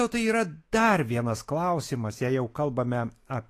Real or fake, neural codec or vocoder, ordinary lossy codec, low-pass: fake; autoencoder, 48 kHz, 128 numbers a frame, DAC-VAE, trained on Japanese speech; AAC, 48 kbps; 14.4 kHz